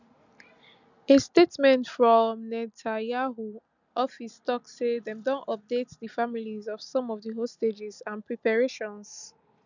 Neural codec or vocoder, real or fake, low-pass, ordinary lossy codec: none; real; 7.2 kHz; none